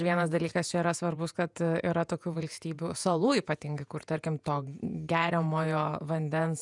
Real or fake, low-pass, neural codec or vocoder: fake; 10.8 kHz; vocoder, 48 kHz, 128 mel bands, Vocos